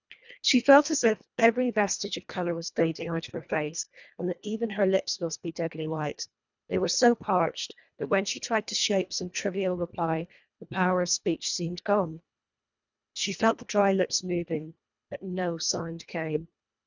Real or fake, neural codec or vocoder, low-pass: fake; codec, 24 kHz, 1.5 kbps, HILCodec; 7.2 kHz